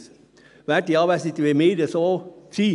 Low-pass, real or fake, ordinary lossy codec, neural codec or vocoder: 10.8 kHz; real; none; none